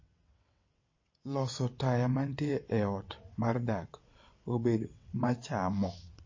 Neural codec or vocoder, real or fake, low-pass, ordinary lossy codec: vocoder, 22.05 kHz, 80 mel bands, WaveNeXt; fake; 7.2 kHz; MP3, 32 kbps